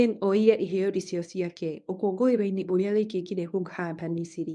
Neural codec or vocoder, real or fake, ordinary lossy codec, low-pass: codec, 24 kHz, 0.9 kbps, WavTokenizer, medium speech release version 1; fake; MP3, 96 kbps; 10.8 kHz